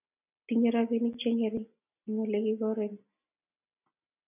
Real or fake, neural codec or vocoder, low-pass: real; none; 3.6 kHz